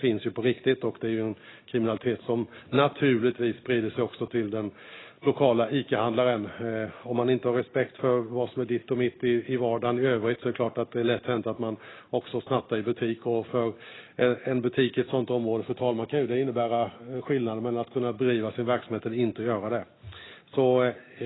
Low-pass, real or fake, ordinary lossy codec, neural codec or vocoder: 7.2 kHz; real; AAC, 16 kbps; none